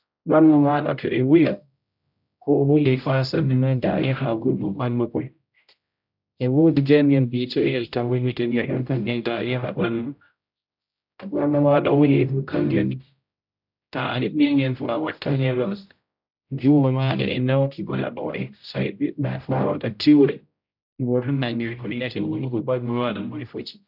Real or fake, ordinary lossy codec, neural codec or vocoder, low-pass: fake; none; codec, 16 kHz, 0.5 kbps, X-Codec, HuBERT features, trained on general audio; 5.4 kHz